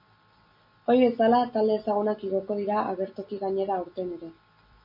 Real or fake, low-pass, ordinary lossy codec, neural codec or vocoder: real; 5.4 kHz; MP3, 48 kbps; none